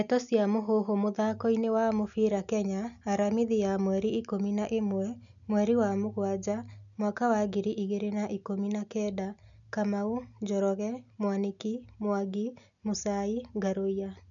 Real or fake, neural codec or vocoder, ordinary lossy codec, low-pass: real; none; none; 7.2 kHz